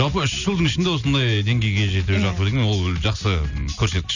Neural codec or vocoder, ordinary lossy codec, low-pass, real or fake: none; none; 7.2 kHz; real